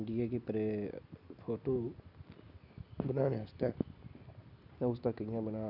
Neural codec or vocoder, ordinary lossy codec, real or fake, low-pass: none; none; real; 5.4 kHz